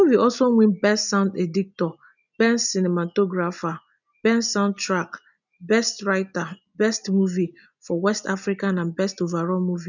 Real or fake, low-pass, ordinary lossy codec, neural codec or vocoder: real; 7.2 kHz; none; none